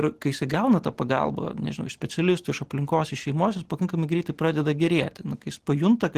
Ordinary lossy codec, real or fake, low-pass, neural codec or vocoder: Opus, 16 kbps; real; 14.4 kHz; none